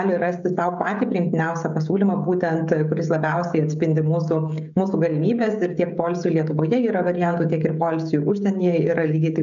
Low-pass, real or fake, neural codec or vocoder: 7.2 kHz; fake; codec, 16 kHz, 16 kbps, FreqCodec, smaller model